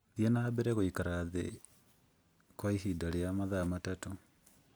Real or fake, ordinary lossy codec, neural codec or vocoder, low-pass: real; none; none; none